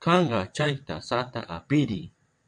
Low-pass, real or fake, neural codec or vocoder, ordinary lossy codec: 9.9 kHz; fake; vocoder, 22.05 kHz, 80 mel bands, WaveNeXt; MP3, 64 kbps